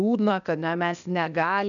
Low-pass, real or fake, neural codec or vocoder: 7.2 kHz; fake; codec, 16 kHz, 0.8 kbps, ZipCodec